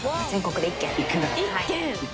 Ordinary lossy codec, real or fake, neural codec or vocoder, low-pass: none; real; none; none